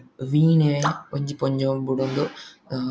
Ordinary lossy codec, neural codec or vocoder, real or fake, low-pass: none; none; real; none